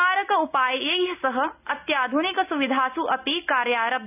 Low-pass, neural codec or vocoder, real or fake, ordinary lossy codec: 3.6 kHz; none; real; none